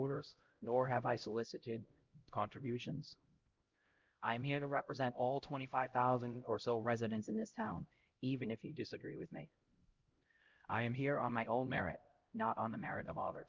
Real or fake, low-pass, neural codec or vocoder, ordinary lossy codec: fake; 7.2 kHz; codec, 16 kHz, 0.5 kbps, X-Codec, HuBERT features, trained on LibriSpeech; Opus, 32 kbps